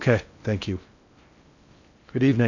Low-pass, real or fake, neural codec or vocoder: 7.2 kHz; fake; codec, 16 kHz in and 24 kHz out, 0.6 kbps, FocalCodec, streaming, 4096 codes